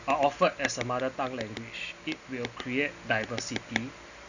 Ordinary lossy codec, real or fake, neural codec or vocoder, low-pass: none; real; none; 7.2 kHz